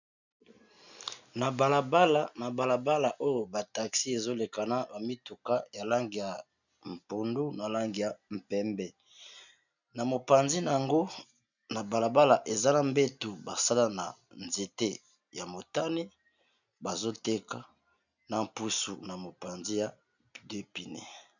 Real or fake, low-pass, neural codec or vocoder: real; 7.2 kHz; none